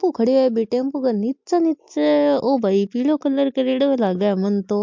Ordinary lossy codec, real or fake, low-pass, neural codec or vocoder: MP3, 48 kbps; real; 7.2 kHz; none